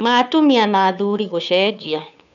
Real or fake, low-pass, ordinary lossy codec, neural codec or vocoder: fake; 7.2 kHz; none; codec, 16 kHz, 2 kbps, FunCodec, trained on Chinese and English, 25 frames a second